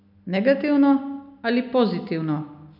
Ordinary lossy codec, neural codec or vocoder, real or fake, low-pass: none; none; real; 5.4 kHz